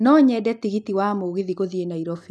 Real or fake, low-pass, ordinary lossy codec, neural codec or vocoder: real; none; none; none